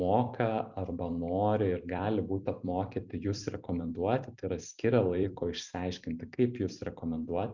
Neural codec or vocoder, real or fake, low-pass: vocoder, 44.1 kHz, 128 mel bands every 256 samples, BigVGAN v2; fake; 7.2 kHz